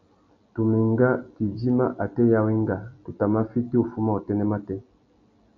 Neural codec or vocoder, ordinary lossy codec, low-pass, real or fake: none; MP3, 64 kbps; 7.2 kHz; real